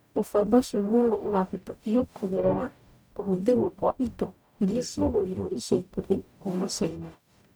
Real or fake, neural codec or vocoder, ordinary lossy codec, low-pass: fake; codec, 44.1 kHz, 0.9 kbps, DAC; none; none